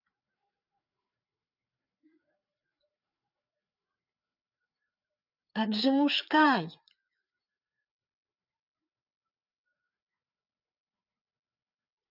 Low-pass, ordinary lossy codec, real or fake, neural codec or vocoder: 5.4 kHz; none; fake; codec, 16 kHz, 4 kbps, FreqCodec, larger model